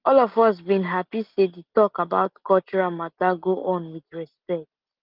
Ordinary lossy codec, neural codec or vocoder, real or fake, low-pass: Opus, 24 kbps; none; real; 5.4 kHz